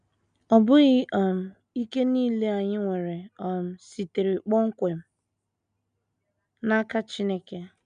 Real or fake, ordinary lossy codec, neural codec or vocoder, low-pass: real; none; none; 9.9 kHz